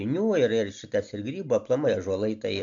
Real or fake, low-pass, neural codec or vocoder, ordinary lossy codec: real; 7.2 kHz; none; MP3, 64 kbps